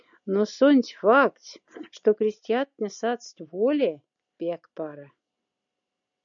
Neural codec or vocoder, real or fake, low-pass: none; real; 7.2 kHz